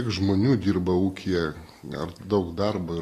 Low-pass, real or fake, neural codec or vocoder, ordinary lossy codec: 14.4 kHz; real; none; AAC, 48 kbps